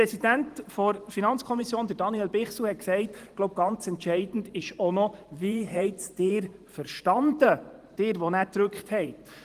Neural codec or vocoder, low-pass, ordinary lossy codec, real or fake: vocoder, 44.1 kHz, 128 mel bands every 512 samples, BigVGAN v2; 14.4 kHz; Opus, 24 kbps; fake